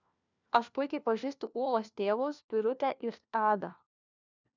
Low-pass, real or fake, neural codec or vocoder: 7.2 kHz; fake; codec, 16 kHz, 1 kbps, FunCodec, trained on LibriTTS, 50 frames a second